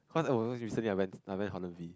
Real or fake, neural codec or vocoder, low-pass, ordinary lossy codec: real; none; none; none